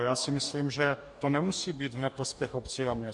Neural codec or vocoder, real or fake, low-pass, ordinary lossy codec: codec, 44.1 kHz, 2.6 kbps, DAC; fake; 10.8 kHz; MP3, 64 kbps